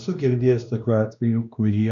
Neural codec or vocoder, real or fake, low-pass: codec, 16 kHz, 1 kbps, X-Codec, WavLM features, trained on Multilingual LibriSpeech; fake; 7.2 kHz